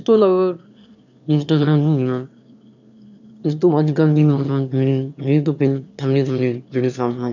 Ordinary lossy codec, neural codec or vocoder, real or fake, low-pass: none; autoencoder, 22.05 kHz, a latent of 192 numbers a frame, VITS, trained on one speaker; fake; 7.2 kHz